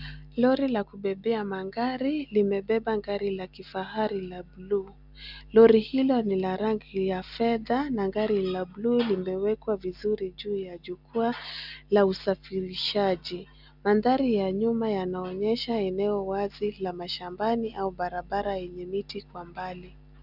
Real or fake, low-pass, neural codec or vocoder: real; 5.4 kHz; none